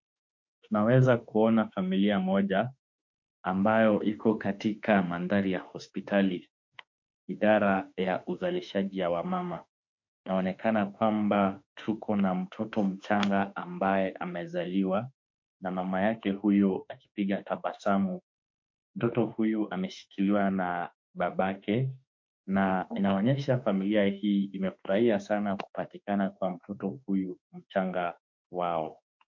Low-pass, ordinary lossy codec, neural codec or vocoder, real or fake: 7.2 kHz; MP3, 48 kbps; autoencoder, 48 kHz, 32 numbers a frame, DAC-VAE, trained on Japanese speech; fake